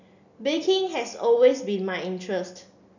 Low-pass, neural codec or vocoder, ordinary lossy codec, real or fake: 7.2 kHz; none; none; real